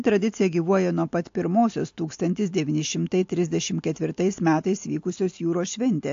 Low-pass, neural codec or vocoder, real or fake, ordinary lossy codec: 7.2 kHz; none; real; AAC, 48 kbps